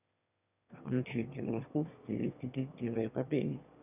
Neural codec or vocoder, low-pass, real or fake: autoencoder, 22.05 kHz, a latent of 192 numbers a frame, VITS, trained on one speaker; 3.6 kHz; fake